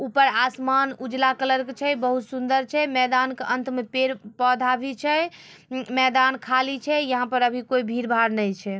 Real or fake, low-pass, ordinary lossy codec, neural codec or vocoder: real; none; none; none